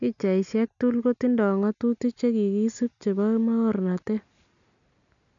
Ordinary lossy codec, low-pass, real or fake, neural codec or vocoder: none; 7.2 kHz; real; none